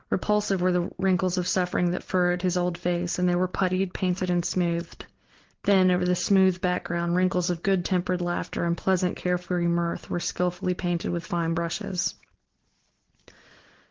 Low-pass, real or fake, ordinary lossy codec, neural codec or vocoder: 7.2 kHz; real; Opus, 16 kbps; none